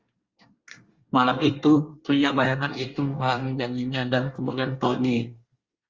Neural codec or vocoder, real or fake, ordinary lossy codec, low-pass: codec, 24 kHz, 1 kbps, SNAC; fake; Opus, 32 kbps; 7.2 kHz